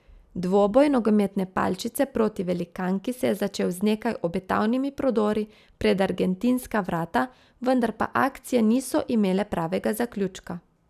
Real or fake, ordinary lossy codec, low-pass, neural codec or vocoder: real; none; 14.4 kHz; none